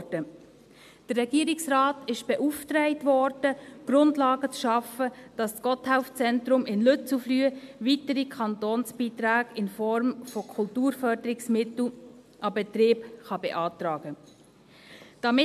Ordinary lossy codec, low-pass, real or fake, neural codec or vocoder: MP3, 96 kbps; 14.4 kHz; real; none